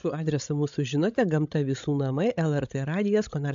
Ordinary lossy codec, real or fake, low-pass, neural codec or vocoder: AAC, 64 kbps; fake; 7.2 kHz; codec, 16 kHz, 16 kbps, FreqCodec, larger model